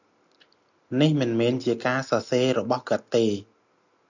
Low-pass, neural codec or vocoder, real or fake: 7.2 kHz; none; real